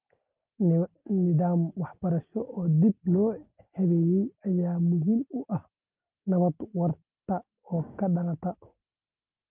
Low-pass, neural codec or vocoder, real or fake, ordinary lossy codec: 3.6 kHz; none; real; Opus, 24 kbps